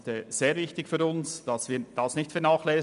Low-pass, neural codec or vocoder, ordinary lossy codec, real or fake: 10.8 kHz; none; none; real